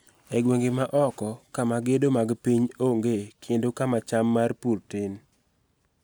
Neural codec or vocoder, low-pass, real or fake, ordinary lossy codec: none; none; real; none